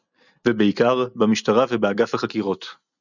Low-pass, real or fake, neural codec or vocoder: 7.2 kHz; real; none